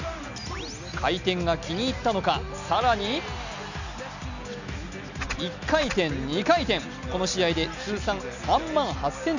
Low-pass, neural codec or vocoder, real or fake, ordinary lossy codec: 7.2 kHz; none; real; none